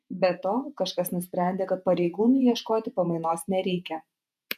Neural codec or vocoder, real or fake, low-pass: vocoder, 48 kHz, 128 mel bands, Vocos; fake; 14.4 kHz